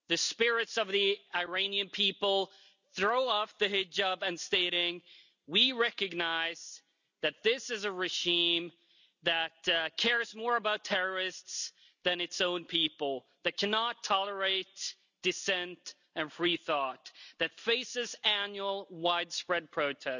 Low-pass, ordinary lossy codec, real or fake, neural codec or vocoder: 7.2 kHz; none; real; none